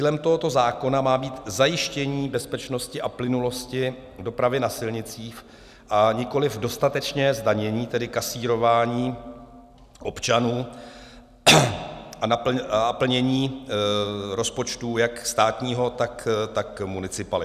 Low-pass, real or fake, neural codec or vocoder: 14.4 kHz; real; none